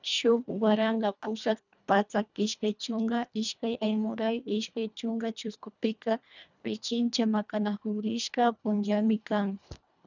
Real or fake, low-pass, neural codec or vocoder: fake; 7.2 kHz; codec, 24 kHz, 1.5 kbps, HILCodec